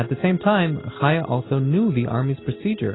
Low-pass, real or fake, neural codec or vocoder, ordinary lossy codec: 7.2 kHz; real; none; AAC, 16 kbps